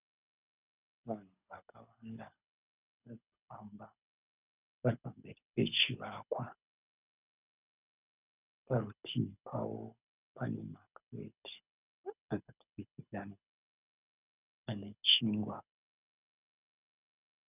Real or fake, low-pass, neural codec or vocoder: fake; 3.6 kHz; codec, 24 kHz, 6 kbps, HILCodec